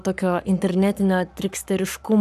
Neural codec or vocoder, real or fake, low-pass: codec, 44.1 kHz, 7.8 kbps, Pupu-Codec; fake; 14.4 kHz